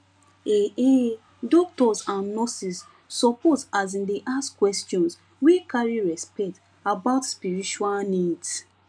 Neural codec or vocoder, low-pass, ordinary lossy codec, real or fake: none; 9.9 kHz; none; real